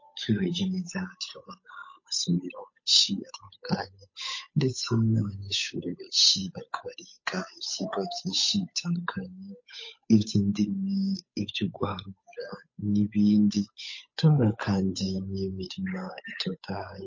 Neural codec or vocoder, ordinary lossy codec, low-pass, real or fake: codec, 16 kHz, 8 kbps, FunCodec, trained on Chinese and English, 25 frames a second; MP3, 32 kbps; 7.2 kHz; fake